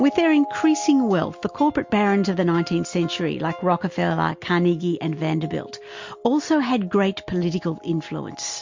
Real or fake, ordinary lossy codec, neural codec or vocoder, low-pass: real; MP3, 48 kbps; none; 7.2 kHz